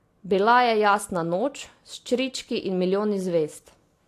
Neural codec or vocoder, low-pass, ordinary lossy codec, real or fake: none; 14.4 kHz; AAC, 64 kbps; real